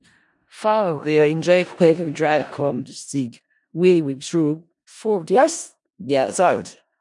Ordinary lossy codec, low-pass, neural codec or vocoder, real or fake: none; 10.8 kHz; codec, 16 kHz in and 24 kHz out, 0.4 kbps, LongCat-Audio-Codec, four codebook decoder; fake